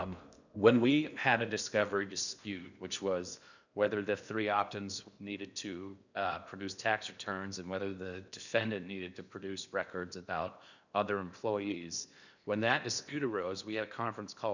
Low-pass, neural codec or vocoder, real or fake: 7.2 kHz; codec, 16 kHz in and 24 kHz out, 0.8 kbps, FocalCodec, streaming, 65536 codes; fake